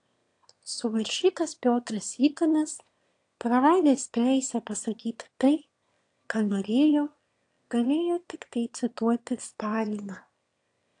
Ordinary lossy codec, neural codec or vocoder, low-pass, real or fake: AAC, 48 kbps; autoencoder, 22.05 kHz, a latent of 192 numbers a frame, VITS, trained on one speaker; 9.9 kHz; fake